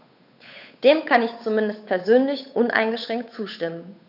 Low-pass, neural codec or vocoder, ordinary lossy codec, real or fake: 5.4 kHz; codec, 16 kHz, 8 kbps, FunCodec, trained on Chinese and English, 25 frames a second; none; fake